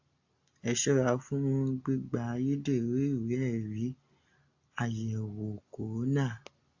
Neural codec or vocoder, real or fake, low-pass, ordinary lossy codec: none; real; 7.2 kHz; AAC, 48 kbps